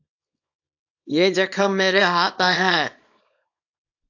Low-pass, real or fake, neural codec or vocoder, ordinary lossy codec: 7.2 kHz; fake; codec, 24 kHz, 0.9 kbps, WavTokenizer, small release; AAC, 48 kbps